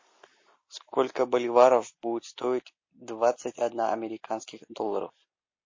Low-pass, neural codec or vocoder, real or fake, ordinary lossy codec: 7.2 kHz; none; real; MP3, 32 kbps